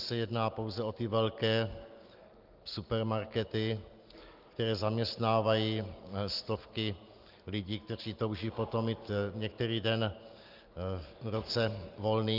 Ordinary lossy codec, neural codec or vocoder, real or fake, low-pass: Opus, 32 kbps; none; real; 5.4 kHz